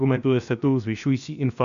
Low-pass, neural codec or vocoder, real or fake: 7.2 kHz; codec, 16 kHz, 0.7 kbps, FocalCodec; fake